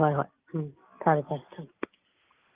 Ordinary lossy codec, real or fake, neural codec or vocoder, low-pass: Opus, 24 kbps; real; none; 3.6 kHz